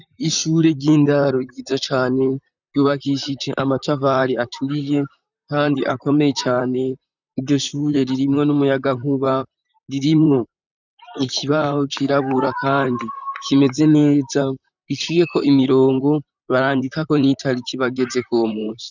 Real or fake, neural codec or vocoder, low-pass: fake; vocoder, 44.1 kHz, 128 mel bands, Pupu-Vocoder; 7.2 kHz